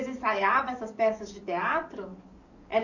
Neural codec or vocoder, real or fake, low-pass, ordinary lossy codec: codec, 44.1 kHz, 7.8 kbps, DAC; fake; 7.2 kHz; none